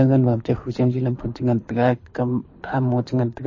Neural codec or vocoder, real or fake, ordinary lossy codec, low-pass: codec, 24 kHz, 6 kbps, HILCodec; fake; MP3, 32 kbps; 7.2 kHz